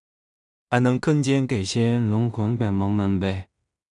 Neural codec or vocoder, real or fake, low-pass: codec, 16 kHz in and 24 kHz out, 0.4 kbps, LongCat-Audio-Codec, two codebook decoder; fake; 10.8 kHz